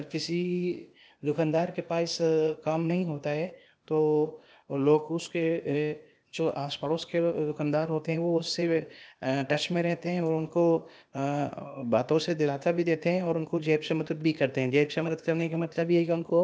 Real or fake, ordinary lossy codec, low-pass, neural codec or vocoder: fake; none; none; codec, 16 kHz, 0.8 kbps, ZipCodec